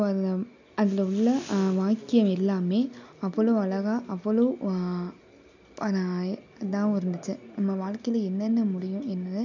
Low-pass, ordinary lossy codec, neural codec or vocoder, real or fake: 7.2 kHz; none; none; real